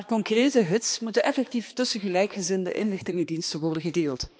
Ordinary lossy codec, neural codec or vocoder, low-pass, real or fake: none; codec, 16 kHz, 2 kbps, X-Codec, HuBERT features, trained on balanced general audio; none; fake